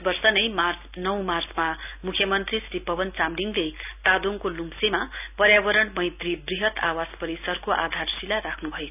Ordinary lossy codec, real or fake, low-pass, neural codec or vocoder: none; real; 3.6 kHz; none